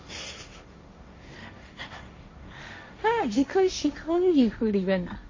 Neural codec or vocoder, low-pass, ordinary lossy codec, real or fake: codec, 16 kHz, 1.1 kbps, Voila-Tokenizer; 7.2 kHz; MP3, 32 kbps; fake